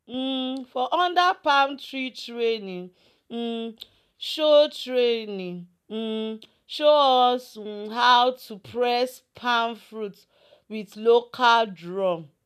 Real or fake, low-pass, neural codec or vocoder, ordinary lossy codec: real; 14.4 kHz; none; none